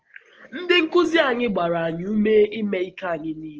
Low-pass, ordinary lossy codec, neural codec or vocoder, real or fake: 7.2 kHz; Opus, 16 kbps; none; real